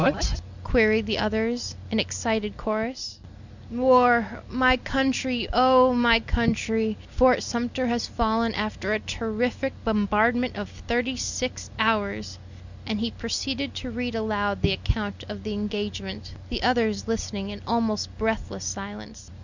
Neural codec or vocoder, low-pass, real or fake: none; 7.2 kHz; real